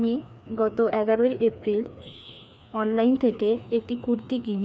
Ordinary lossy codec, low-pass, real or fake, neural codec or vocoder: none; none; fake; codec, 16 kHz, 2 kbps, FreqCodec, larger model